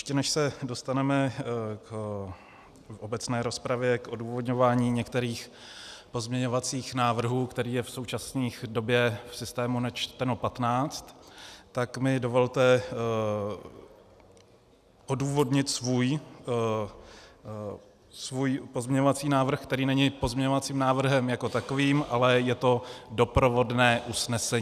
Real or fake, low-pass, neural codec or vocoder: real; 14.4 kHz; none